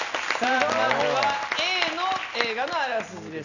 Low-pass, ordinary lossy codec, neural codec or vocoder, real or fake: 7.2 kHz; none; none; real